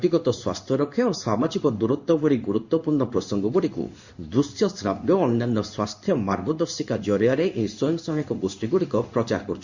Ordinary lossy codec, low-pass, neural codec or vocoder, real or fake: Opus, 64 kbps; 7.2 kHz; codec, 16 kHz in and 24 kHz out, 1 kbps, XY-Tokenizer; fake